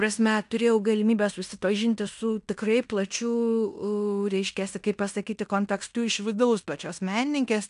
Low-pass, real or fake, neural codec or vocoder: 10.8 kHz; fake; codec, 16 kHz in and 24 kHz out, 0.9 kbps, LongCat-Audio-Codec, fine tuned four codebook decoder